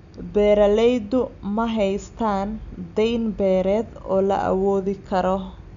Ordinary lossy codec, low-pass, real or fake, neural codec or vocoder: none; 7.2 kHz; real; none